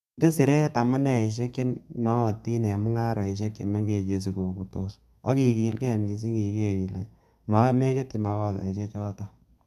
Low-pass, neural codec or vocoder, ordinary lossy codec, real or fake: 14.4 kHz; codec, 32 kHz, 1.9 kbps, SNAC; none; fake